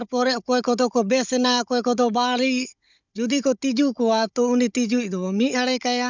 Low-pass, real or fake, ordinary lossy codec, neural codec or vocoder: 7.2 kHz; fake; none; codec, 16 kHz, 16 kbps, FunCodec, trained on Chinese and English, 50 frames a second